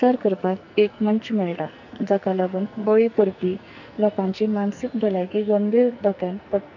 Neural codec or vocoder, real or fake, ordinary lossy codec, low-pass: codec, 44.1 kHz, 2.6 kbps, SNAC; fake; none; 7.2 kHz